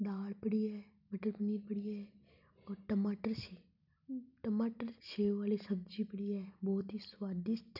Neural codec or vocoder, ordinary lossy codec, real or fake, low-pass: none; none; real; 5.4 kHz